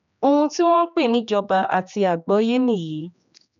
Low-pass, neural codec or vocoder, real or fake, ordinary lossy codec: 7.2 kHz; codec, 16 kHz, 2 kbps, X-Codec, HuBERT features, trained on general audio; fake; none